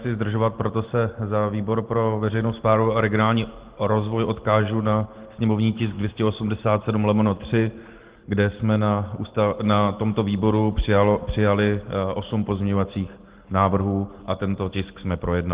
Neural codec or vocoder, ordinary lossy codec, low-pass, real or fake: none; Opus, 16 kbps; 3.6 kHz; real